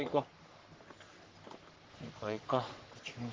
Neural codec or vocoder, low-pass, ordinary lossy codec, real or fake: codec, 44.1 kHz, 3.4 kbps, Pupu-Codec; 7.2 kHz; Opus, 16 kbps; fake